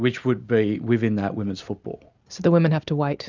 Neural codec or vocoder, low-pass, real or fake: none; 7.2 kHz; real